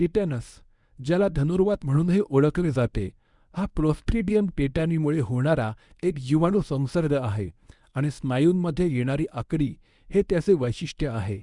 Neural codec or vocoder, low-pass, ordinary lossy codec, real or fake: codec, 24 kHz, 0.9 kbps, WavTokenizer, medium speech release version 1; 10.8 kHz; Opus, 64 kbps; fake